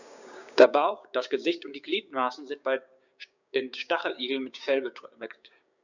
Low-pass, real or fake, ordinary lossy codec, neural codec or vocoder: 7.2 kHz; fake; none; codec, 16 kHz, 6 kbps, DAC